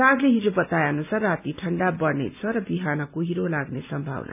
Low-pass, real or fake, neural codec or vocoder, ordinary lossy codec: 3.6 kHz; real; none; none